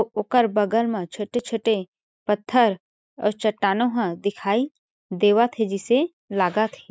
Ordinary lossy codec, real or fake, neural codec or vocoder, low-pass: none; real; none; none